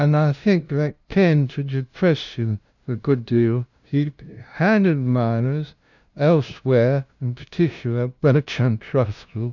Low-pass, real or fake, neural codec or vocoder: 7.2 kHz; fake; codec, 16 kHz, 0.5 kbps, FunCodec, trained on LibriTTS, 25 frames a second